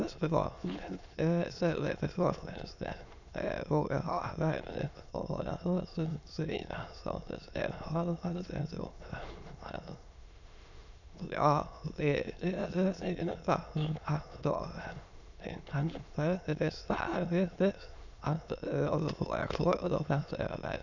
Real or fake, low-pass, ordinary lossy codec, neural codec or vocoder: fake; 7.2 kHz; none; autoencoder, 22.05 kHz, a latent of 192 numbers a frame, VITS, trained on many speakers